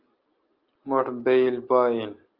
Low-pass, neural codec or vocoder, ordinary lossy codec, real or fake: 5.4 kHz; none; Opus, 32 kbps; real